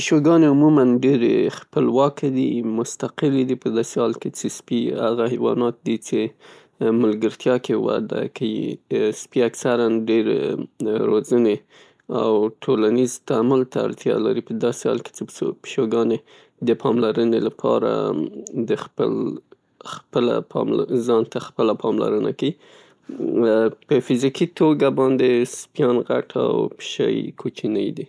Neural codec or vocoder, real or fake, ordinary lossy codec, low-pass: none; real; none; none